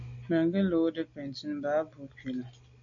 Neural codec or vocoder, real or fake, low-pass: none; real; 7.2 kHz